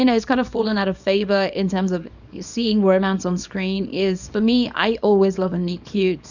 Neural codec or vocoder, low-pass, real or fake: codec, 24 kHz, 0.9 kbps, WavTokenizer, small release; 7.2 kHz; fake